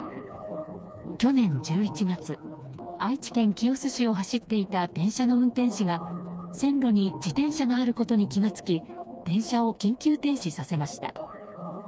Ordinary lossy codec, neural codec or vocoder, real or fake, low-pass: none; codec, 16 kHz, 2 kbps, FreqCodec, smaller model; fake; none